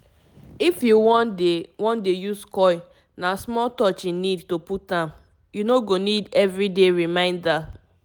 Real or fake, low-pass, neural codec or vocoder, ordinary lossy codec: real; none; none; none